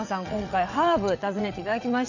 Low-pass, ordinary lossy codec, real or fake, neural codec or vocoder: 7.2 kHz; none; fake; vocoder, 22.05 kHz, 80 mel bands, WaveNeXt